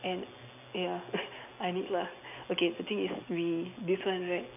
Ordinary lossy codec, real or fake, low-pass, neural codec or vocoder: none; real; 3.6 kHz; none